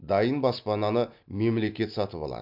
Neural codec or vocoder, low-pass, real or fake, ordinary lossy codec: none; 5.4 kHz; real; MP3, 48 kbps